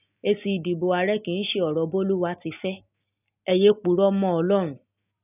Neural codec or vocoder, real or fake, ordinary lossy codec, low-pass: none; real; none; 3.6 kHz